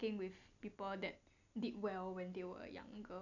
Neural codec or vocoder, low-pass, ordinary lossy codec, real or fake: none; 7.2 kHz; none; real